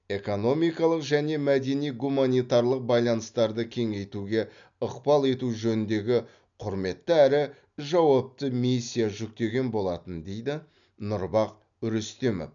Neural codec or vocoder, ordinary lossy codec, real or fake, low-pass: none; none; real; 7.2 kHz